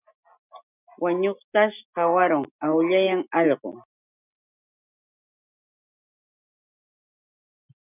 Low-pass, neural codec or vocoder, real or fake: 3.6 kHz; none; real